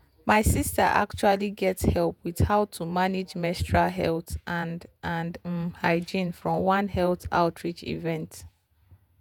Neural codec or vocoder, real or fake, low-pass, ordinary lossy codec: vocoder, 48 kHz, 128 mel bands, Vocos; fake; none; none